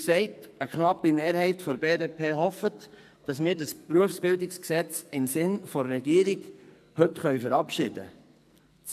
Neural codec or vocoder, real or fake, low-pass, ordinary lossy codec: codec, 44.1 kHz, 2.6 kbps, SNAC; fake; 14.4 kHz; MP3, 96 kbps